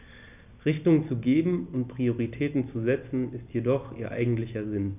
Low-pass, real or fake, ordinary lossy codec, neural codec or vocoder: 3.6 kHz; real; none; none